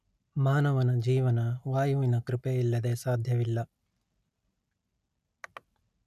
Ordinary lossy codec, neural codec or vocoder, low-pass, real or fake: none; none; 14.4 kHz; real